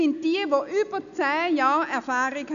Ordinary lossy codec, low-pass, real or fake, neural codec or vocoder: none; 7.2 kHz; real; none